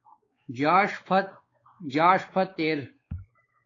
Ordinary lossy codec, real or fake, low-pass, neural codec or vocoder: AAC, 32 kbps; fake; 7.2 kHz; codec, 16 kHz, 4 kbps, X-Codec, WavLM features, trained on Multilingual LibriSpeech